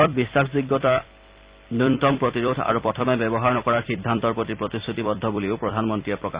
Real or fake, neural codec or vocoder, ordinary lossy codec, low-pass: fake; vocoder, 44.1 kHz, 128 mel bands every 256 samples, BigVGAN v2; Opus, 64 kbps; 3.6 kHz